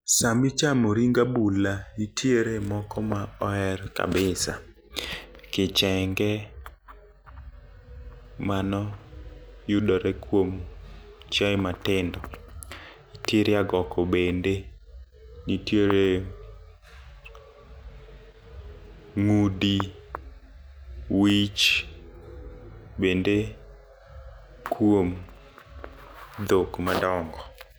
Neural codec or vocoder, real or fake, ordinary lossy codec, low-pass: none; real; none; none